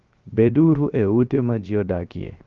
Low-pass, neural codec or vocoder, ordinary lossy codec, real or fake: 7.2 kHz; codec, 16 kHz, 0.7 kbps, FocalCodec; Opus, 24 kbps; fake